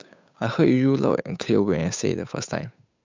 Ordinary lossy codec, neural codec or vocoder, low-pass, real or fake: MP3, 64 kbps; codec, 16 kHz, 8 kbps, FunCodec, trained on Chinese and English, 25 frames a second; 7.2 kHz; fake